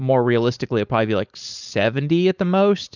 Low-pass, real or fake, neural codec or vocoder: 7.2 kHz; fake; codec, 16 kHz, 4.8 kbps, FACodec